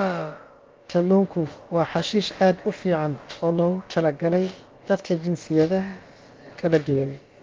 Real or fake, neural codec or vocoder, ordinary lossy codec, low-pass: fake; codec, 16 kHz, about 1 kbps, DyCAST, with the encoder's durations; Opus, 24 kbps; 7.2 kHz